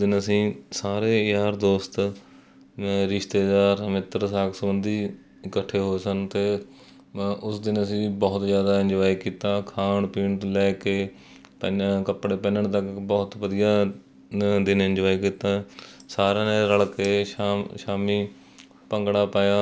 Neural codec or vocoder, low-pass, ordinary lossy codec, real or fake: none; none; none; real